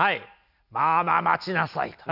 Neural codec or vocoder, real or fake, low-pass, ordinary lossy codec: none; real; 5.4 kHz; none